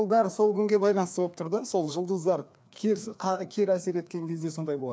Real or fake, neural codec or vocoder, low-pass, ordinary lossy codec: fake; codec, 16 kHz, 2 kbps, FreqCodec, larger model; none; none